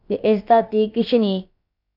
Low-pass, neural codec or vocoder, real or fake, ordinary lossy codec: 5.4 kHz; codec, 16 kHz, about 1 kbps, DyCAST, with the encoder's durations; fake; none